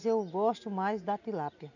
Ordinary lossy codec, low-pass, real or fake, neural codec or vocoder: none; 7.2 kHz; real; none